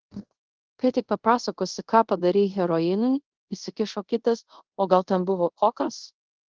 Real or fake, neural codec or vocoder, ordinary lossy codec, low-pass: fake; codec, 24 kHz, 0.5 kbps, DualCodec; Opus, 16 kbps; 7.2 kHz